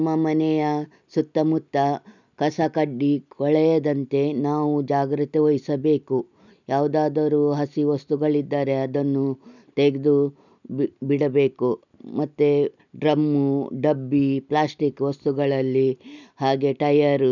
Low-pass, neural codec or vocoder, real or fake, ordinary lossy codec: 7.2 kHz; none; real; none